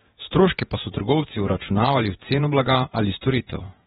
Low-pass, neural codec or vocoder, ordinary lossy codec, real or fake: 19.8 kHz; vocoder, 48 kHz, 128 mel bands, Vocos; AAC, 16 kbps; fake